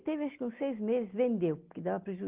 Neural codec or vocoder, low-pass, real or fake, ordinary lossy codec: none; 3.6 kHz; real; Opus, 16 kbps